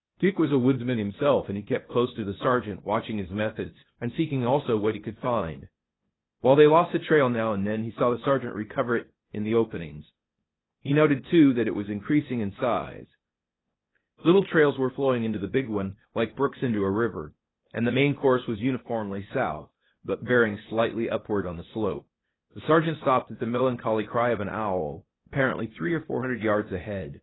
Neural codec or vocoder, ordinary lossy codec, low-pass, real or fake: codec, 16 kHz, 0.8 kbps, ZipCodec; AAC, 16 kbps; 7.2 kHz; fake